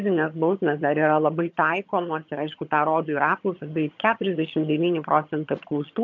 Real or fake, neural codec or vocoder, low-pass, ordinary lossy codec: fake; vocoder, 22.05 kHz, 80 mel bands, HiFi-GAN; 7.2 kHz; MP3, 48 kbps